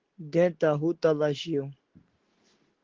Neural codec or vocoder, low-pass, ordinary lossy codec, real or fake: none; 7.2 kHz; Opus, 16 kbps; real